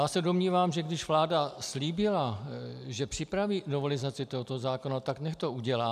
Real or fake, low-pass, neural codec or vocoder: real; 14.4 kHz; none